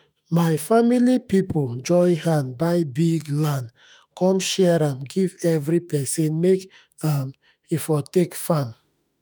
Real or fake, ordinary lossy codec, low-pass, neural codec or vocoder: fake; none; none; autoencoder, 48 kHz, 32 numbers a frame, DAC-VAE, trained on Japanese speech